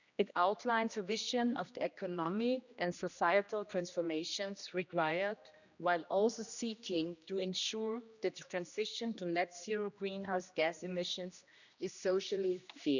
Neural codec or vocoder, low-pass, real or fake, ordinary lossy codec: codec, 16 kHz, 1 kbps, X-Codec, HuBERT features, trained on general audio; 7.2 kHz; fake; none